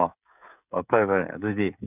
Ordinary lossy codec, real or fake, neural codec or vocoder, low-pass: none; fake; codec, 16 kHz, 16 kbps, FreqCodec, smaller model; 3.6 kHz